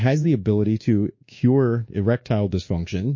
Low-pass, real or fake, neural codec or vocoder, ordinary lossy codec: 7.2 kHz; fake; codec, 24 kHz, 1.2 kbps, DualCodec; MP3, 32 kbps